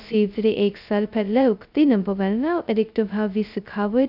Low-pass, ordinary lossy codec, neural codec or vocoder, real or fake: 5.4 kHz; none; codec, 16 kHz, 0.2 kbps, FocalCodec; fake